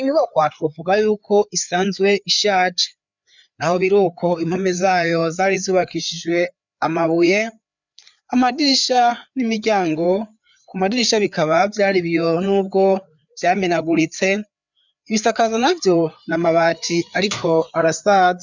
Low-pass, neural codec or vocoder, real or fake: 7.2 kHz; codec, 16 kHz, 4 kbps, FreqCodec, larger model; fake